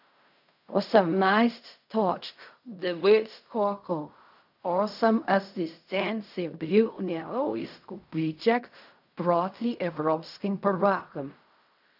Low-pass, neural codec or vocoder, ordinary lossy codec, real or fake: 5.4 kHz; codec, 16 kHz in and 24 kHz out, 0.4 kbps, LongCat-Audio-Codec, fine tuned four codebook decoder; none; fake